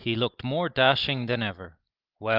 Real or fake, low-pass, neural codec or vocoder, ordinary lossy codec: real; 5.4 kHz; none; Opus, 24 kbps